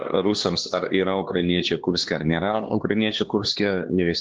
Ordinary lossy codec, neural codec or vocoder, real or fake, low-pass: Opus, 16 kbps; codec, 16 kHz, 2 kbps, X-Codec, HuBERT features, trained on LibriSpeech; fake; 7.2 kHz